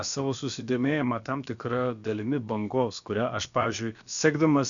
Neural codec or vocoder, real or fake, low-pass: codec, 16 kHz, about 1 kbps, DyCAST, with the encoder's durations; fake; 7.2 kHz